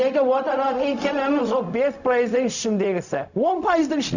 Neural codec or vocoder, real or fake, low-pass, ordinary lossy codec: codec, 16 kHz, 0.4 kbps, LongCat-Audio-Codec; fake; 7.2 kHz; none